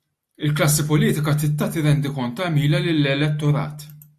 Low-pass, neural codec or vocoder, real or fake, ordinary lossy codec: 14.4 kHz; none; real; AAC, 96 kbps